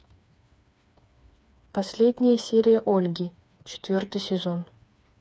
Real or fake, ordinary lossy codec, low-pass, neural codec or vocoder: fake; none; none; codec, 16 kHz, 4 kbps, FreqCodec, smaller model